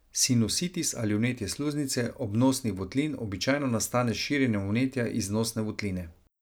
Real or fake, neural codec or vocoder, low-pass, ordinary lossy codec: real; none; none; none